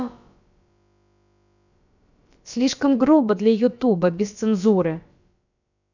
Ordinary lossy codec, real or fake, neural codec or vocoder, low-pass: none; fake; codec, 16 kHz, about 1 kbps, DyCAST, with the encoder's durations; 7.2 kHz